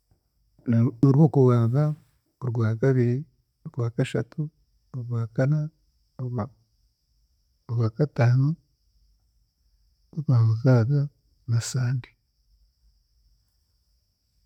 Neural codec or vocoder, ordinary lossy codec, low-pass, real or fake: none; none; 19.8 kHz; real